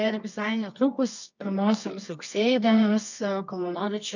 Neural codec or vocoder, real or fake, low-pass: codec, 24 kHz, 0.9 kbps, WavTokenizer, medium music audio release; fake; 7.2 kHz